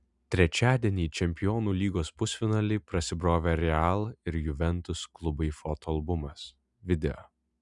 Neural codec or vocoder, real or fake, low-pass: none; real; 10.8 kHz